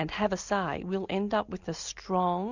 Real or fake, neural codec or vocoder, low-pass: real; none; 7.2 kHz